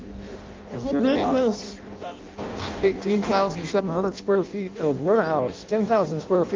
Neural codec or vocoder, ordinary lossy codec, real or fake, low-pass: codec, 16 kHz in and 24 kHz out, 0.6 kbps, FireRedTTS-2 codec; Opus, 24 kbps; fake; 7.2 kHz